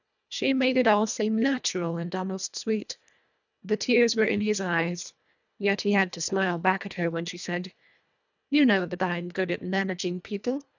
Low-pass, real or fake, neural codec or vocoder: 7.2 kHz; fake; codec, 24 kHz, 1.5 kbps, HILCodec